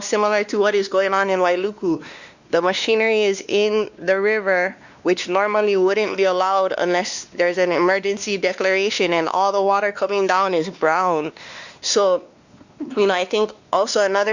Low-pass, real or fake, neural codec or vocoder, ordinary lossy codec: 7.2 kHz; fake; codec, 16 kHz, 2 kbps, X-Codec, WavLM features, trained on Multilingual LibriSpeech; Opus, 64 kbps